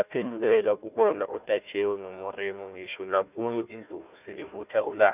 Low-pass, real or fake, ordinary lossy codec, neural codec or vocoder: 3.6 kHz; fake; none; codec, 16 kHz, 1 kbps, FunCodec, trained on Chinese and English, 50 frames a second